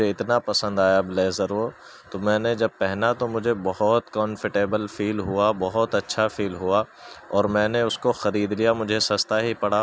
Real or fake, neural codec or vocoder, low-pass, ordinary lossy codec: real; none; none; none